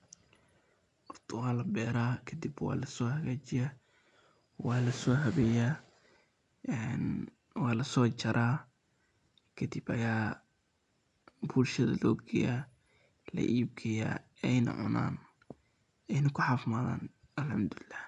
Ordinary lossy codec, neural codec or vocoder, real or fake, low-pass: none; none; real; 10.8 kHz